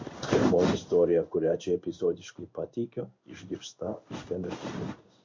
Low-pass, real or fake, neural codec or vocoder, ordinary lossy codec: 7.2 kHz; fake; codec, 16 kHz in and 24 kHz out, 1 kbps, XY-Tokenizer; MP3, 48 kbps